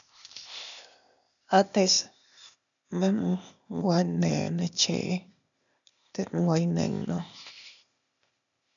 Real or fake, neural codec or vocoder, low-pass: fake; codec, 16 kHz, 0.8 kbps, ZipCodec; 7.2 kHz